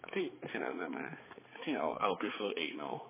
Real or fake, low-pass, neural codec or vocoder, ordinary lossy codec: fake; 3.6 kHz; codec, 16 kHz, 4 kbps, X-Codec, HuBERT features, trained on balanced general audio; MP3, 16 kbps